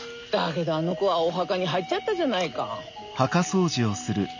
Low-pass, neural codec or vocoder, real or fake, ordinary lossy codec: 7.2 kHz; none; real; none